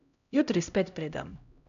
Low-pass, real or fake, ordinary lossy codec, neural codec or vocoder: 7.2 kHz; fake; none; codec, 16 kHz, 0.5 kbps, X-Codec, HuBERT features, trained on LibriSpeech